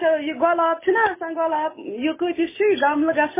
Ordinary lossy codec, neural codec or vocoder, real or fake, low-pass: MP3, 16 kbps; none; real; 3.6 kHz